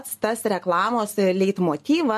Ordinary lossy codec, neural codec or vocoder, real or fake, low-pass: MP3, 64 kbps; none; real; 14.4 kHz